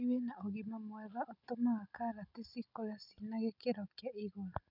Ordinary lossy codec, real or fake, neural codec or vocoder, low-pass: none; real; none; 5.4 kHz